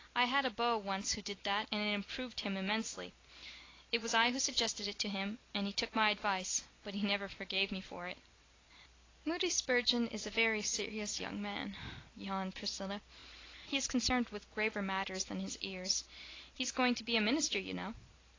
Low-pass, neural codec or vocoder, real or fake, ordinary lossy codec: 7.2 kHz; none; real; AAC, 32 kbps